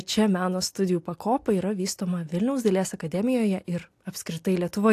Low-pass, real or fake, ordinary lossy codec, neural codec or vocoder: 14.4 kHz; real; AAC, 64 kbps; none